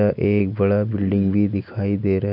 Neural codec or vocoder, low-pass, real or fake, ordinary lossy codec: none; 5.4 kHz; real; none